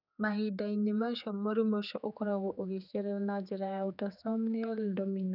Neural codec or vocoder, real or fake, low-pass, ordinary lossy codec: codec, 16 kHz, 4 kbps, X-Codec, HuBERT features, trained on general audio; fake; 5.4 kHz; none